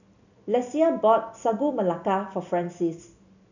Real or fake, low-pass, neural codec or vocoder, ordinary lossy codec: real; 7.2 kHz; none; none